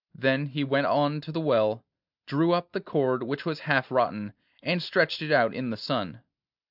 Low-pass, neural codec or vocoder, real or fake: 5.4 kHz; none; real